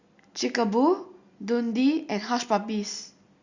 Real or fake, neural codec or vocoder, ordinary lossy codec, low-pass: real; none; Opus, 64 kbps; 7.2 kHz